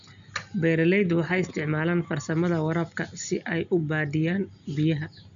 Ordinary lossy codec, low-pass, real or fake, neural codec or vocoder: none; 7.2 kHz; real; none